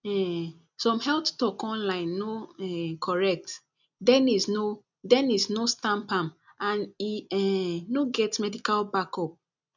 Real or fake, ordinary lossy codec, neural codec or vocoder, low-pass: real; none; none; 7.2 kHz